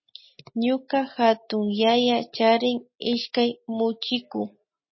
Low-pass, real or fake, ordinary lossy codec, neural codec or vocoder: 7.2 kHz; real; MP3, 24 kbps; none